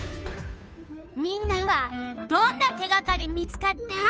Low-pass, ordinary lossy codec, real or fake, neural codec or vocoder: none; none; fake; codec, 16 kHz, 2 kbps, FunCodec, trained on Chinese and English, 25 frames a second